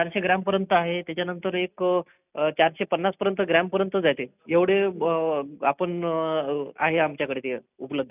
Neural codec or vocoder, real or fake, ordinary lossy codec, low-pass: none; real; none; 3.6 kHz